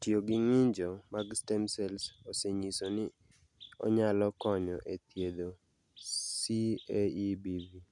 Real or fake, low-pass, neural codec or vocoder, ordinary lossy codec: real; 10.8 kHz; none; none